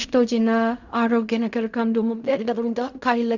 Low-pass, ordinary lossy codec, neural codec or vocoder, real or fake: 7.2 kHz; none; codec, 16 kHz in and 24 kHz out, 0.4 kbps, LongCat-Audio-Codec, fine tuned four codebook decoder; fake